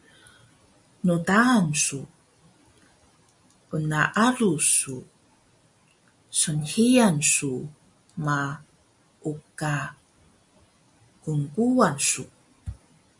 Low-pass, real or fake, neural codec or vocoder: 10.8 kHz; real; none